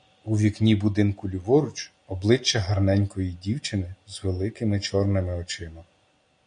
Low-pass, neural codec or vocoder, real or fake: 9.9 kHz; none; real